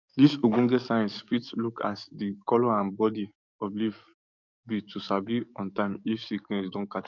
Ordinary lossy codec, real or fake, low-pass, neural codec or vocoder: none; fake; 7.2 kHz; codec, 44.1 kHz, 7.8 kbps, DAC